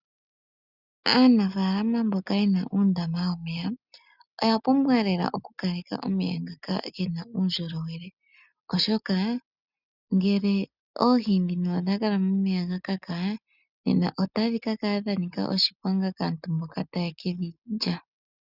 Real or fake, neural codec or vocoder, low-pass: real; none; 5.4 kHz